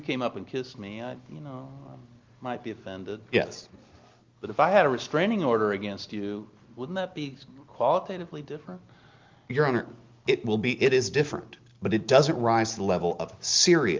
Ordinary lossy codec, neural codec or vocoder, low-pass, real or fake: Opus, 24 kbps; none; 7.2 kHz; real